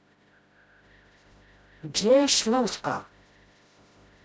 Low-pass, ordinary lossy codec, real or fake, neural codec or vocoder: none; none; fake; codec, 16 kHz, 0.5 kbps, FreqCodec, smaller model